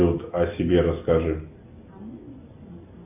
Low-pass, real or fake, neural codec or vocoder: 3.6 kHz; real; none